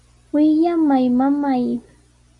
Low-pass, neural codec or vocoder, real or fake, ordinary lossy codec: 10.8 kHz; none; real; AAC, 64 kbps